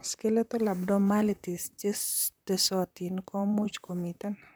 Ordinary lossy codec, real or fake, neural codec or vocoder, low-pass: none; fake; vocoder, 44.1 kHz, 128 mel bands, Pupu-Vocoder; none